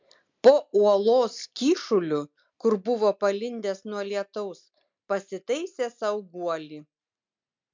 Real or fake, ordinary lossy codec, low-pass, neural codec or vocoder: real; MP3, 64 kbps; 7.2 kHz; none